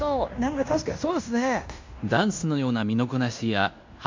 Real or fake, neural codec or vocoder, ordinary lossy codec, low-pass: fake; codec, 16 kHz in and 24 kHz out, 0.9 kbps, LongCat-Audio-Codec, fine tuned four codebook decoder; MP3, 64 kbps; 7.2 kHz